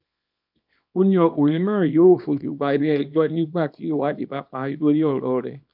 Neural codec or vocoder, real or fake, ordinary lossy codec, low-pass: codec, 24 kHz, 0.9 kbps, WavTokenizer, small release; fake; none; 5.4 kHz